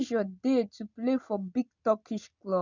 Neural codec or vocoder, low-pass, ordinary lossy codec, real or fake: none; 7.2 kHz; none; real